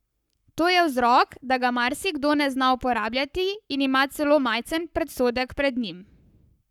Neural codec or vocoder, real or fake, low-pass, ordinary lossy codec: codec, 44.1 kHz, 7.8 kbps, Pupu-Codec; fake; 19.8 kHz; none